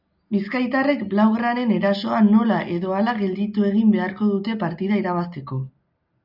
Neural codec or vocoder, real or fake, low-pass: none; real; 5.4 kHz